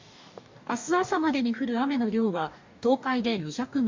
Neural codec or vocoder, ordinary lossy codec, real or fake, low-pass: codec, 44.1 kHz, 2.6 kbps, DAC; MP3, 64 kbps; fake; 7.2 kHz